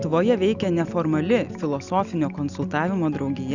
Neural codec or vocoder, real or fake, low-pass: none; real; 7.2 kHz